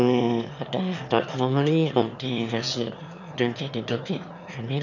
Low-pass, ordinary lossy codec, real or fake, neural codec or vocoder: 7.2 kHz; none; fake; autoencoder, 22.05 kHz, a latent of 192 numbers a frame, VITS, trained on one speaker